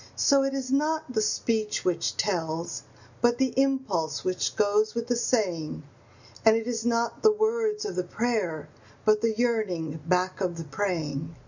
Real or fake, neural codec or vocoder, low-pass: real; none; 7.2 kHz